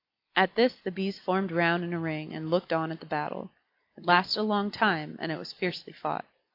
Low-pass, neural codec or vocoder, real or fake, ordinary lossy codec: 5.4 kHz; none; real; AAC, 32 kbps